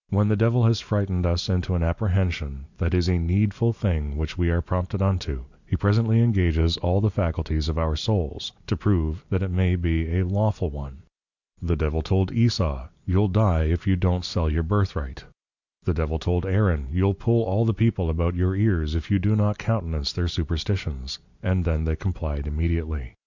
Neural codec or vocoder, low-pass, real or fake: none; 7.2 kHz; real